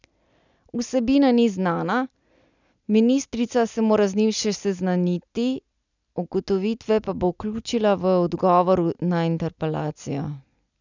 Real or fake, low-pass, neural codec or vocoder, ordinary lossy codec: real; 7.2 kHz; none; none